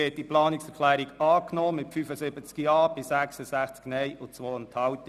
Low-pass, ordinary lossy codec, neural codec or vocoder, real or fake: 14.4 kHz; none; none; real